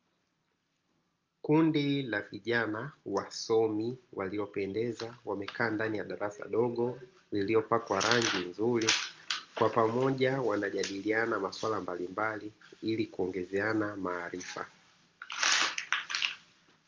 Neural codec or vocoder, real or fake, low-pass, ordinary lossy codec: none; real; 7.2 kHz; Opus, 24 kbps